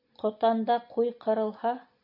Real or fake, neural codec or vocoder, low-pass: real; none; 5.4 kHz